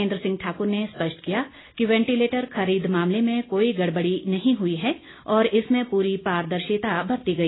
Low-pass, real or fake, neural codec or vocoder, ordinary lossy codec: 7.2 kHz; real; none; AAC, 16 kbps